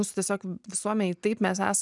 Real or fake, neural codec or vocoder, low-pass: real; none; 10.8 kHz